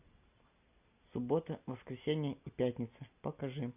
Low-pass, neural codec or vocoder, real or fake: 3.6 kHz; none; real